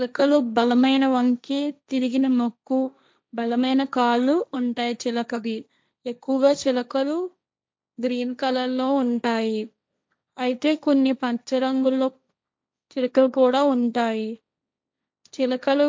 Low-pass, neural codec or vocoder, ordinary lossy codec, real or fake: none; codec, 16 kHz, 1.1 kbps, Voila-Tokenizer; none; fake